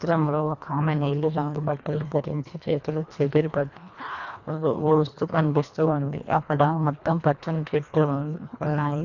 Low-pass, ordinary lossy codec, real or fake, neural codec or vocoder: 7.2 kHz; none; fake; codec, 24 kHz, 1.5 kbps, HILCodec